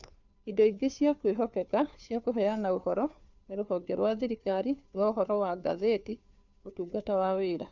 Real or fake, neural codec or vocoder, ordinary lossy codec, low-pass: fake; codec, 16 kHz, 2 kbps, FunCodec, trained on Chinese and English, 25 frames a second; none; 7.2 kHz